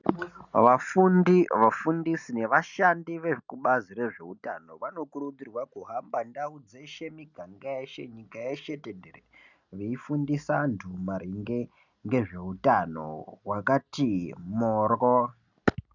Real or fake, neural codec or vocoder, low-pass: real; none; 7.2 kHz